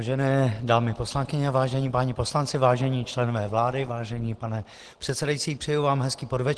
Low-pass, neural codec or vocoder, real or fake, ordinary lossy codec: 10.8 kHz; none; real; Opus, 16 kbps